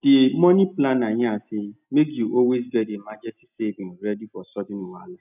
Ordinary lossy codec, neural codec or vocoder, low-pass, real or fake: none; none; 3.6 kHz; real